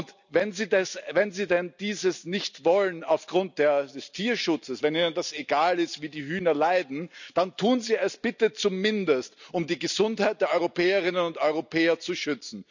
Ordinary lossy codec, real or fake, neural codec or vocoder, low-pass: none; real; none; 7.2 kHz